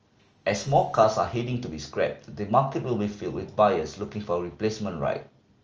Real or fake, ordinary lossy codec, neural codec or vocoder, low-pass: real; Opus, 24 kbps; none; 7.2 kHz